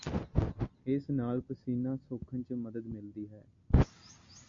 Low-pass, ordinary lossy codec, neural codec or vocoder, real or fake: 7.2 kHz; MP3, 48 kbps; none; real